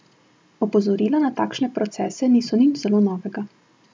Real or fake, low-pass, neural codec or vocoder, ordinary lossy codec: real; none; none; none